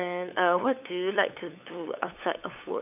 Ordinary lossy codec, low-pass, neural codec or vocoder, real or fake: none; 3.6 kHz; codec, 16 kHz, 8 kbps, FunCodec, trained on LibriTTS, 25 frames a second; fake